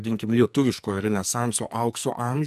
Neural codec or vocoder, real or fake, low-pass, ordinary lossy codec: codec, 44.1 kHz, 2.6 kbps, SNAC; fake; 14.4 kHz; MP3, 96 kbps